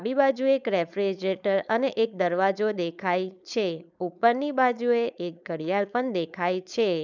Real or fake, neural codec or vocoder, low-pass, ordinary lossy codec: fake; codec, 16 kHz, 4.8 kbps, FACodec; 7.2 kHz; none